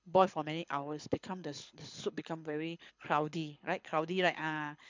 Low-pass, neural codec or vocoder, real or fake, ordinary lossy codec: 7.2 kHz; codec, 24 kHz, 6 kbps, HILCodec; fake; MP3, 64 kbps